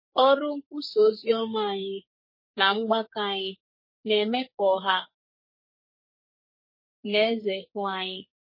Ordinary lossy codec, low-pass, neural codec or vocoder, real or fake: MP3, 24 kbps; 5.4 kHz; codec, 44.1 kHz, 2.6 kbps, SNAC; fake